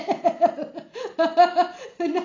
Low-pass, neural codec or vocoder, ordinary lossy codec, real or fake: 7.2 kHz; none; AAC, 32 kbps; real